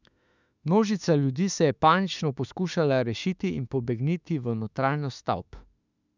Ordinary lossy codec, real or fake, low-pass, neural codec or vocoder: none; fake; 7.2 kHz; autoencoder, 48 kHz, 32 numbers a frame, DAC-VAE, trained on Japanese speech